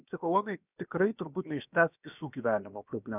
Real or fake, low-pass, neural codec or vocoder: fake; 3.6 kHz; codec, 16 kHz, about 1 kbps, DyCAST, with the encoder's durations